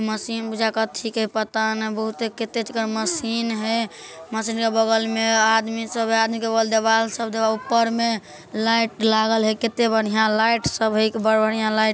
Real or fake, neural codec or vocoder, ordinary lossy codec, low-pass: real; none; none; none